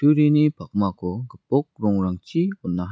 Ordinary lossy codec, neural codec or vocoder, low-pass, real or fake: none; none; none; real